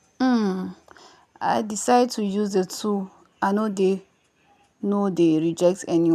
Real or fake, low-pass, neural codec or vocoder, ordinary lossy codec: real; 14.4 kHz; none; none